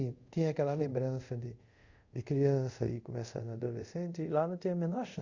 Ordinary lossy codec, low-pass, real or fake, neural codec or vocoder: Opus, 64 kbps; 7.2 kHz; fake; codec, 24 kHz, 0.5 kbps, DualCodec